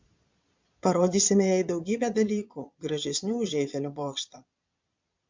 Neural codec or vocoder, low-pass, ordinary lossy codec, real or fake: vocoder, 22.05 kHz, 80 mel bands, Vocos; 7.2 kHz; MP3, 64 kbps; fake